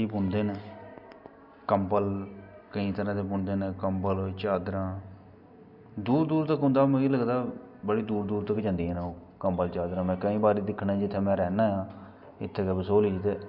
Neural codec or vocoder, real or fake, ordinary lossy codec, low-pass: none; real; none; 5.4 kHz